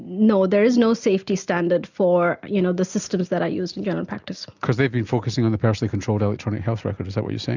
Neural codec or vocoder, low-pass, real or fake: none; 7.2 kHz; real